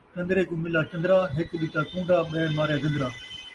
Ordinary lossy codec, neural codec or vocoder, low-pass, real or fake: Opus, 24 kbps; none; 10.8 kHz; real